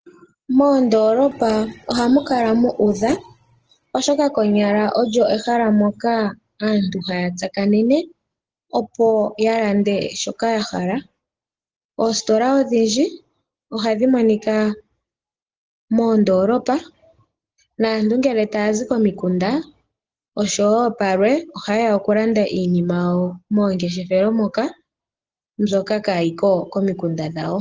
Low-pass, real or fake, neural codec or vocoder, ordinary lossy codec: 7.2 kHz; real; none; Opus, 16 kbps